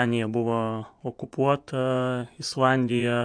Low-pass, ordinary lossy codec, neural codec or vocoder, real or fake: 9.9 kHz; AAC, 64 kbps; vocoder, 24 kHz, 100 mel bands, Vocos; fake